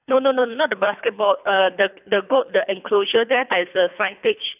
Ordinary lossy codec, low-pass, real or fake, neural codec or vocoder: none; 3.6 kHz; fake; codec, 24 kHz, 3 kbps, HILCodec